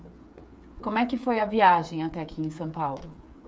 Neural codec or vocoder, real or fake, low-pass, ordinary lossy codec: codec, 16 kHz, 8 kbps, FreqCodec, smaller model; fake; none; none